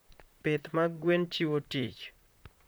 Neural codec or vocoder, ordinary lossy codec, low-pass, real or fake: vocoder, 44.1 kHz, 128 mel bands, Pupu-Vocoder; none; none; fake